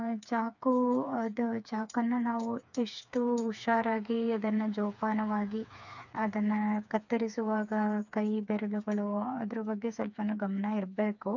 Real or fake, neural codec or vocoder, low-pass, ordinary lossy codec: fake; codec, 16 kHz, 4 kbps, FreqCodec, smaller model; 7.2 kHz; none